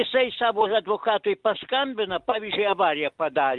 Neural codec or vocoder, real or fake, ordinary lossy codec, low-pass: none; real; Opus, 32 kbps; 10.8 kHz